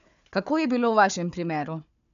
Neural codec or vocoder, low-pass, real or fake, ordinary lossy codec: codec, 16 kHz, 4 kbps, FunCodec, trained on Chinese and English, 50 frames a second; 7.2 kHz; fake; none